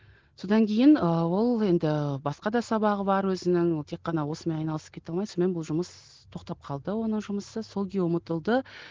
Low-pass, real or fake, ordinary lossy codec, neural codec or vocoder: 7.2 kHz; real; Opus, 16 kbps; none